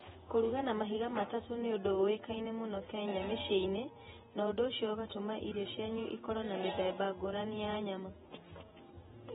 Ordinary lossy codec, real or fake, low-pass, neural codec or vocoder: AAC, 16 kbps; fake; 19.8 kHz; vocoder, 48 kHz, 128 mel bands, Vocos